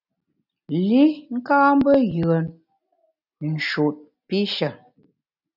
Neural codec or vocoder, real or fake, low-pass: none; real; 5.4 kHz